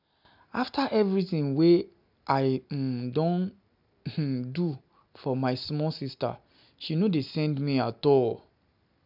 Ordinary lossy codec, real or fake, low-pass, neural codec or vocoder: none; real; 5.4 kHz; none